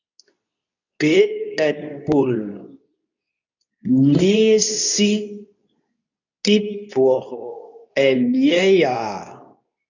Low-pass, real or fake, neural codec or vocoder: 7.2 kHz; fake; codec, 24 kHz, 0.9 kbps, WavTokenizer, medium speech release version 2